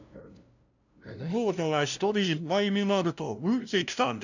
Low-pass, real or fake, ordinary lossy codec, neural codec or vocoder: 7.2 kHz; fake; none; codec, 16 kHz, 0.5 kbps, FunCodec, trained on LibriTTS, 25 frames a second